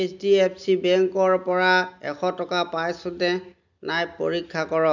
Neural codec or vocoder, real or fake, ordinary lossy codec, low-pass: none; real; none; 7.2 kHz